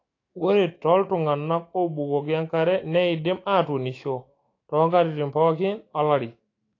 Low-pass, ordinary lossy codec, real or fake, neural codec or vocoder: 7.2 kHz; AAC, 32 kbps; fake; autoencoder, 48 kHz, 128 numbers a frame, DAC-VAE, trained on Japanese speech